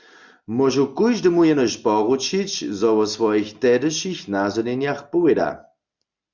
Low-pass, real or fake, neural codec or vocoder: 7.2 kHz; real; none